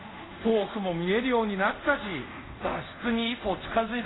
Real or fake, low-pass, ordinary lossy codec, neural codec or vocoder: fake; 7.2 kHz; AAC, 16 kbps; codec, 24 kHz, 0.5 kbps, DualCodec